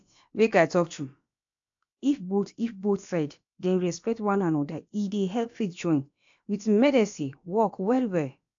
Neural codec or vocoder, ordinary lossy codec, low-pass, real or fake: codec, 16 kHz, about 1 kbps, DyCAST, with the encoder's durations; AAC, 64 kbps; 7.2 kHz; fake